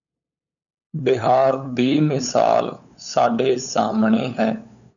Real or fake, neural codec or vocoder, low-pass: fake; codec, 16 kHz, 8 kbps, FunCodec, trained on LibriTTS, 25 frames a second; 7.2 kHz